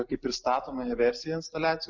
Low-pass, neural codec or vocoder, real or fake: 7.2 kHz; none; real